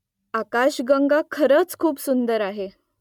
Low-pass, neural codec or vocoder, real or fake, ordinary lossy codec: 19.8 kHz; none; real; MP3, 96 kbps